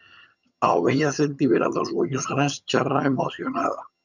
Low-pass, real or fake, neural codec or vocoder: 7.2 kHz; fake; vocoder, 22.05 kHz, 80 mel bands, HiFi-GAN